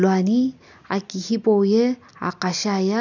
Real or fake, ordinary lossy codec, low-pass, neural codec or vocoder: real; none; 7.2 kHz; none